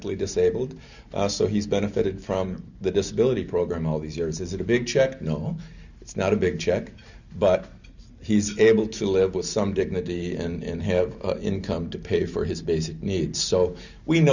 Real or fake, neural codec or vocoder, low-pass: real; none; 7.2 kHz